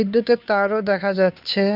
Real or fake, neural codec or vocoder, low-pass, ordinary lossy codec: fake; codec, 16 kHz, 2 kbps, FunCodec, trained on Chinese and English, 25 frames a second; 5.4 kHz; none